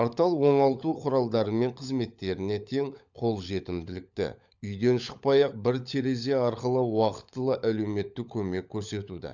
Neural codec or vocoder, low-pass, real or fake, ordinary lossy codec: codec, 16 kHz, 16 kbps, FunCodec, trained on LibriTTS, 50 frames a second; 7.2 kHz; fake; none